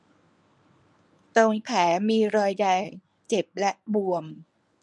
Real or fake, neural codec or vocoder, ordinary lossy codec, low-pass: fake; codec, 24 kHz, 0.9 kbps, WavTokenizer, medium speech release version 1; none; none